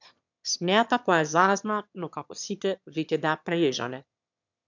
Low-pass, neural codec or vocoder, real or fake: 7.2 kHz; autoencoder, 22.05 kHz, a latent of 192 numbers a frame, VITS, trained on one speaker; fake